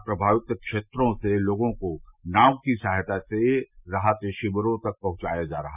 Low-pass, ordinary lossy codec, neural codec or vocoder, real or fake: 3.6 kHz; none; none; real